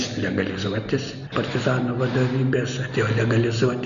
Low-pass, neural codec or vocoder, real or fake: 7.2 kHz; none; real